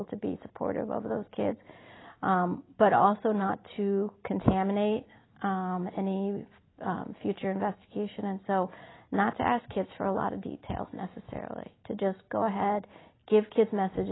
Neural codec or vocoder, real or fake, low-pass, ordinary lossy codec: none; real; 7.2 kHz; AAC, 16 kbps